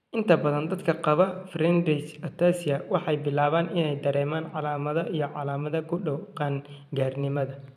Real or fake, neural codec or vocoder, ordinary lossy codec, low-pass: fake; vocoder, 44.1 kHz, 128 mel bands every 512 samples, BigVGAN v2; none; 14.4 kHz